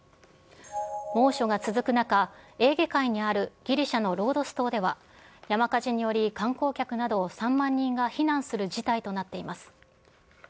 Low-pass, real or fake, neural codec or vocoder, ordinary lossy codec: none; real; none; none